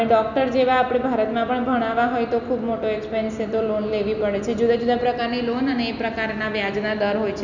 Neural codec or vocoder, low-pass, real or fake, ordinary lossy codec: none; 7.2 kHz; real; none